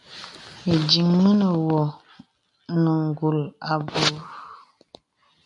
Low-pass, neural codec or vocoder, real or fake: 10.8 kHz; none; real